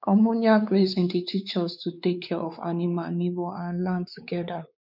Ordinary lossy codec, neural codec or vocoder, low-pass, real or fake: none; codec, 16 kHz, 4 kbps, X-Codec, WavLM features, trained on Multilingual LibriSpeech; 5.4 kHz; fake